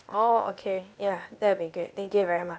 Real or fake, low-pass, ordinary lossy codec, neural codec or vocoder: fake; none; none; codec, 16 kHz, 0.8 kbps, ZipCodec